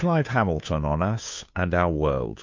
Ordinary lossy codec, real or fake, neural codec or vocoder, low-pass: MP3, 48 kbps; fake; codec, 16 kHz, 2 kbps, FunCodec, trained on Chinese and English, 25 frames a second; 7.2 kHz